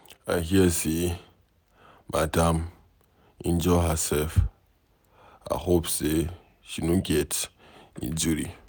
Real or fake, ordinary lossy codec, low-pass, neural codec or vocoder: real; none; none; none